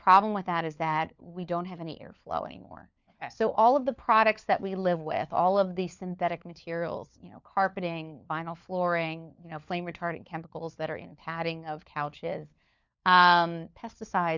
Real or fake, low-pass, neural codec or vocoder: fake; 7.2 kHz; codec, 16 kHz, 4 kbps, FunCodec, trained on LibriTTS, 50 frames a second